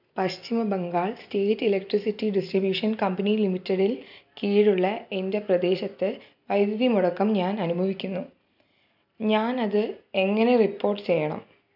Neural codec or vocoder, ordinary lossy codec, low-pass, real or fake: none; none; 5.4 kHz; real